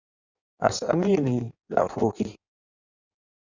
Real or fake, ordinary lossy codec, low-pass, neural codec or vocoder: fake; Opus, 64 kbps; 7.2 kHz; codec, 16 kHz in and 24 kHz out, 1.1 kbps, FireRedTTS-2 codec